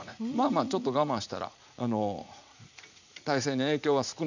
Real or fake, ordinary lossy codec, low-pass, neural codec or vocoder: fake; none; 7.2 kHz; vocoder, 44.1 kHz, 80 mel bands, Vocos